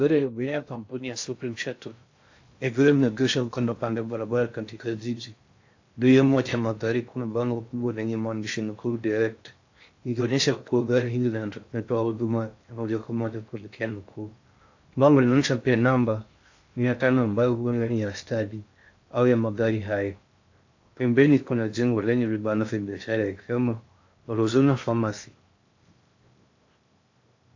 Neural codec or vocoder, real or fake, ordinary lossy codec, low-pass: codec, 16 kHz in and 24 kHz out, 0.6 kbps, FocalCodec, streaming, 2048 codes; fake; AAC, 48 kbps; 7.2 kHz